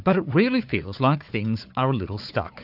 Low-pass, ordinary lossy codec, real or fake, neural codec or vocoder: 5.4 kHz; AAC, 48 kbps; fake; codec, 16 kHz, 4 kbps, FunCodec, trained on Chinese and English, 50 frames a second